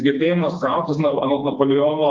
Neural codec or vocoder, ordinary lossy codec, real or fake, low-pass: codec, 16 kHz, 2 kbps, FreqCodec, smaller model; Opus, 32 kbps; fake; 7.2 kHz